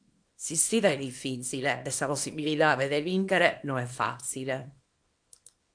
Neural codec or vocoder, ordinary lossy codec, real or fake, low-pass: codec, 24 kHz, 0.9 kbps, WavTokenizer, small release; AAC, 64 kbps; fake; 9.9 kHz